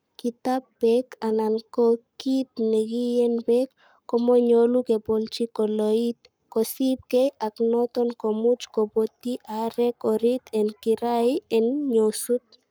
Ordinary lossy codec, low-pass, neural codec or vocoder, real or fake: none; none; codec, 44.1 kHz, 7.8 kbps, Pupu-Codec; fake